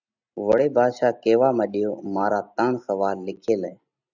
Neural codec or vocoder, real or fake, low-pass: none; real; 7.2 kHz